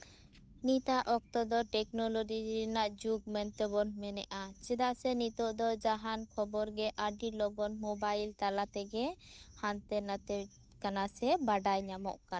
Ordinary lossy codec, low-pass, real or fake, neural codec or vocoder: none; none; fake; codec, 16 kHz, 8 kbps, FunCodec, trained on Chinese and English, 25 frames a second